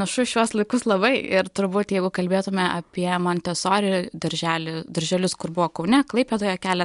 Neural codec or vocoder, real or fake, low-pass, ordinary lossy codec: none; real; 10.8 kHz; MP3, 64 kbps